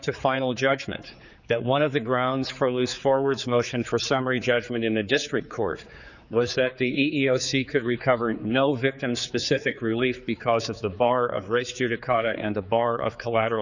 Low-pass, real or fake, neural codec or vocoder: 7.2 kHz; fake; codec, 16 kHz, 4 kbps, X-Codec, HuBERT features, trained on general audio